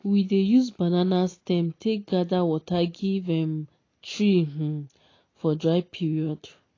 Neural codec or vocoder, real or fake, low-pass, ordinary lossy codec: none; real; 7.2 kHz; AAC, 32 kbps